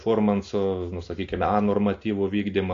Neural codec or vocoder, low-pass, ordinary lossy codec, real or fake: none; 7.2 kHz; AAC, 48 kbps; real